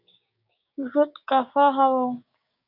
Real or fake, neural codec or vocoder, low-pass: fake; codec, 16 kHz, 6 kbps, DAC; 5.4 kHz